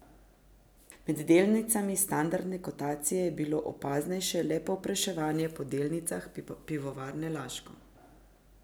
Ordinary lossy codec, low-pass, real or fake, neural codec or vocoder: none; none; real; none